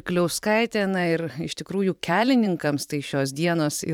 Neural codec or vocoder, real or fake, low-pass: vocoder, 44.1 kHz, 128 mel bands every 512 samples, BigVGAN v2; fake; 19.8 kHz